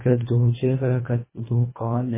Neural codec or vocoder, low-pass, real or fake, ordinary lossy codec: codec, 24 kHz, 1.5 kbps, HILCodec; 3.6 kHz; fake; MP3, 16 kbps